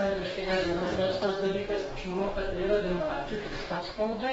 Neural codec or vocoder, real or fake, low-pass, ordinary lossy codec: codec, 44.1 kHz, 2.6 kbps, DAC; fake; 19.8 kHz; AAC, 24 kbps